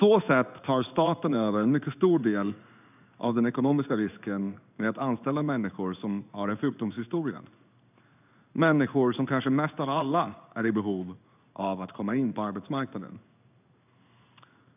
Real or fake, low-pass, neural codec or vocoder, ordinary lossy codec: fake; 3.6 kHz; codec, 16 kHz in and 24 kHz out, 1 kbps, XY-Tokenizer; none